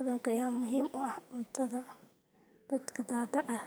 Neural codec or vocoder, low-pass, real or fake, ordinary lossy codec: codec, 44.1 kHz, 2.6 kbps, SNAC; none; fake; none